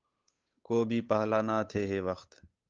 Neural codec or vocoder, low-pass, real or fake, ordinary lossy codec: codec, 16 kHz, 8 kbps, FunCodec, trained on Chinese and English, 25 frames a second; 7.2 kHz; fake; Opus, 24 kbps